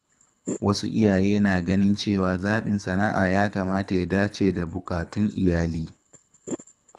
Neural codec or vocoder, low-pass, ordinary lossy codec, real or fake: codec, 24 kHz, 3 kbps, HILCodec; none; none; fake